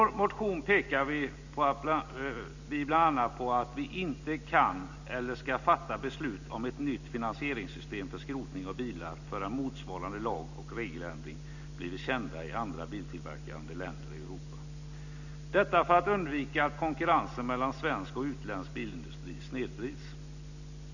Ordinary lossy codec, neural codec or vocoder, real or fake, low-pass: none; none; real; 7.2 kHz